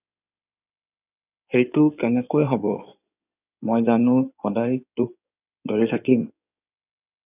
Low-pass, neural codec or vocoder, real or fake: 3.6 kHz; codec, 16 kHz in and 24 kHz out, 2.2 kbps, FireRedTTS-2 codec; fake